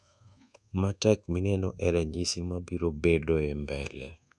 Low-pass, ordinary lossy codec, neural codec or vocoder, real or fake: none; none; codec, 24 kHz, 1.2 kbps, DualCodec; fake